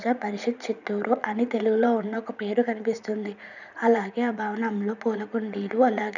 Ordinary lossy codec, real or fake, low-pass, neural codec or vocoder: none; fake; 7.2 kHz; vocoder, 44.1 kHz, 80 mel bands, Vocos